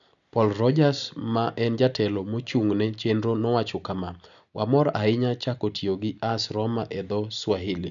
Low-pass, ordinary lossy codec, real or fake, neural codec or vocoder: 7.2 kHz; none; real; none